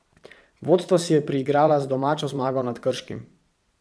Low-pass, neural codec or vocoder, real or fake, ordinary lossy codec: none; vocoder, 22.05 kHz, 80 mel bands, Vocos; fake; none